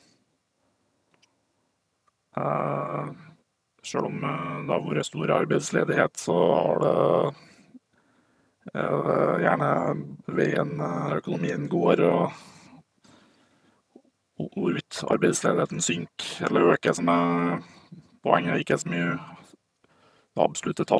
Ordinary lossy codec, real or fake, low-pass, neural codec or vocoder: none; fake; none; vocoder, 22.05 kHz, 80 mel bands, HiFi-GAN